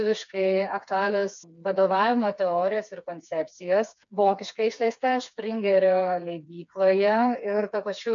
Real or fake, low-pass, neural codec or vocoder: fake; 7.2 kHz; codec, 16 kHz, 4 kbps, FreqCodec, smaller model